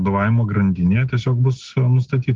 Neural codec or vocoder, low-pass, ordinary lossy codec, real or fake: none; 7.2 kHz; Opus, 24 kbps; real